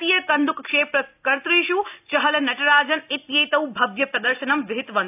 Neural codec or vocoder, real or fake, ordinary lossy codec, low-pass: none; real; AAC, 32 kbps; 3.6 kHz